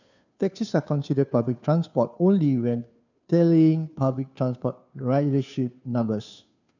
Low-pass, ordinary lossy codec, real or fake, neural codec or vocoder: 7.2 kHz; none; fake; codec, 16 kHz, 2 kbps, FunCodec, trained on Chinese and English, 25 frames a second